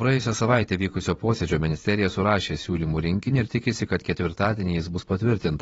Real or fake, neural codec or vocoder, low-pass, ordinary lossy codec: fake; vocoder, 44.1 kHz, 128 mel bands every 512 samples, BigVGAN v2; 19.8 kHz; AAC, 24 kbps